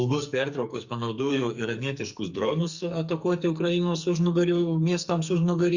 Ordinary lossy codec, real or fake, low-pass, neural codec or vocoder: Opus, 64 kbps; fake; 7.2 kHz; codec, 44.1 kHz, 2.6 kbps, SNAC